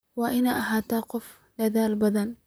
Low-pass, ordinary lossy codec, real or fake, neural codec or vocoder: none; none; fake; vocoder, 44.1 kHz, 128 mel bands, Pupu-Vocoder